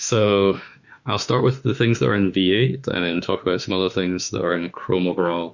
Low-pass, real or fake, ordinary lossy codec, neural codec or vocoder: 7.2 kHz; fake; Opus, 64 kbps; autoencoder, 48 kHz, 32 numbers a frame, DAC-VAE, trained on Japanese speech